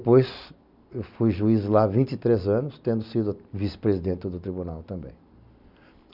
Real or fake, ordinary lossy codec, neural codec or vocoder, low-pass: real; none; none; 5.4 kHz